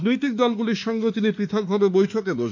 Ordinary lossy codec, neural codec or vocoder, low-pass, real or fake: none; autoencoder, 48 kHz, 32 numbers a frame, DAC-VAE, trained on Japanese speech; 7.2 kHz; fake